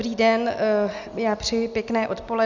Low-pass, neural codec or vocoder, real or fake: 7.2 kHz; none; real